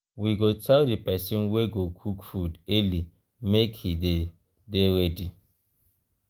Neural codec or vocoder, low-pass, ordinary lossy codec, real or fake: autoencoder, 48 kHz, 128 numbers a frame, DAC-VAE, trained on Japanese speech; 19.8 kHz; Opus, 24 kbps; fake